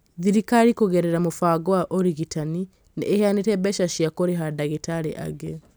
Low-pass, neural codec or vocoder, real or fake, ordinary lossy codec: none; none; real; none